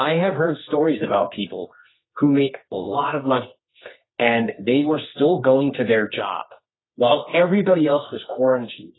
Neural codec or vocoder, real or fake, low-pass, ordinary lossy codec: codec, 24 kHz, 0.9 kbps, WavTokenizer, medium music audio release; fake; 7.2 kHz; AAC, 16 kbps